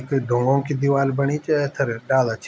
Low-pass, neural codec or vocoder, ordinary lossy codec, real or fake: none; none; none; real